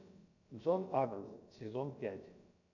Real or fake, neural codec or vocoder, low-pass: fake; codec, 16 kHz, about 1 kbps, DyCAST, with the encoder's durations; 7.2 kHz